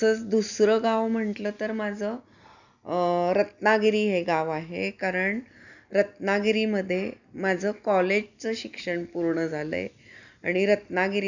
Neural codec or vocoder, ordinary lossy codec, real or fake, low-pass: none; none; real; 7.2 kHz